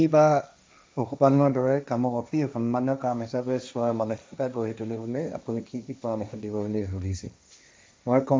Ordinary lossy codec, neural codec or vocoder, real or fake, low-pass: none; codec, 16 kHz, 1.1 kbps, Voila-Tokenizer; fake; none